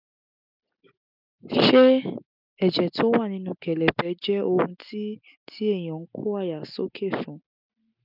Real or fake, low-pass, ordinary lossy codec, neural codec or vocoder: real; 5.4 kHz; none; none